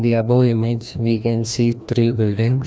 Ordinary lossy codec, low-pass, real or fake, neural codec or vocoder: none; none; fake; codec, 16 kHz, 1 kbps, FreqCodec, larger model